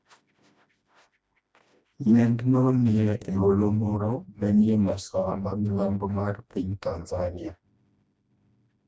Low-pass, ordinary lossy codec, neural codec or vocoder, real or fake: none; none; codec, 16 kHz, 1 kbps, FreqCodec, smaller model; fake